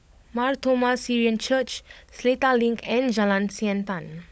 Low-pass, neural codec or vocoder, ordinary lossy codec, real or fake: none; codec, 16 kHz, 16 kbps, FunCodec, trained on LibriTTS, 50 frames a second; none; fake